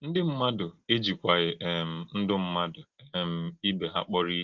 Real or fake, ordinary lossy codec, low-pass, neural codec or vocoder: real; Opus, 16 kbps; 7.2 kHz; none